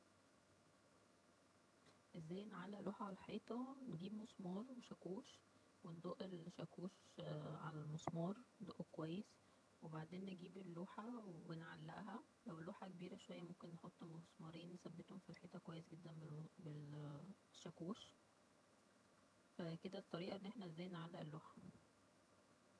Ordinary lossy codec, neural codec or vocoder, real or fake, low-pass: none; vocoder, 22.05 kHz, 80 mel bands, HiFi-GAN; fake; none